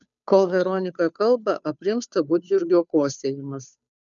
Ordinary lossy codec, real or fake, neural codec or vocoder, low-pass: MP3, 96 kbps; fake; codec, 16 kHz, 2 kbps, FunCodec, trained on Chinese and English, 25 frames a second; 7.2 kHz